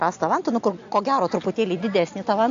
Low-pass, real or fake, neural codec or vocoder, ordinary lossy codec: 7.2 kHz; real; none; AAC, 48 kbps